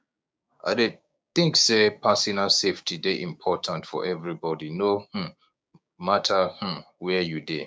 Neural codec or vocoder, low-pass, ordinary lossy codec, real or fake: codec, 16 kHz, 6 kbps, DAC; none; none; fake